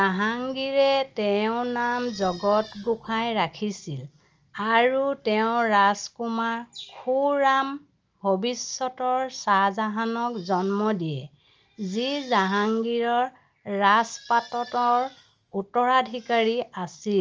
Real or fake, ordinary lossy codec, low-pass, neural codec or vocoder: real; Opus, 24 kbps; 7.2 kHz; none